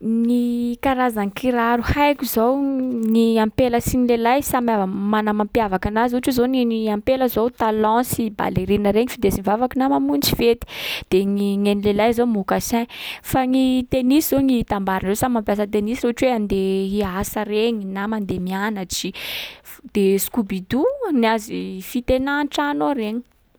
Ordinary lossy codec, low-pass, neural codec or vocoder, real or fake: none; none; none; real